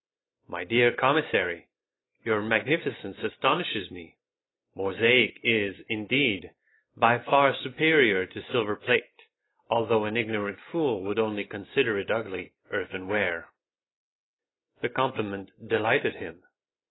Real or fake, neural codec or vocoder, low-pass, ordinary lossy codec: real; none; 7.2 kHz; AAC, 16 kbps